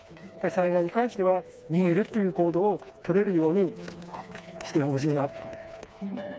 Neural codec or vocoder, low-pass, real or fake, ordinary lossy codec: codec, 16 kHz, 2 kbps, FreqCodec, smaller model; none; fake; none